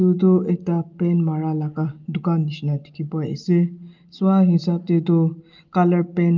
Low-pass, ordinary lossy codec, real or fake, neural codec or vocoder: 7.2 kHz; Opus, 32 kbps; real; none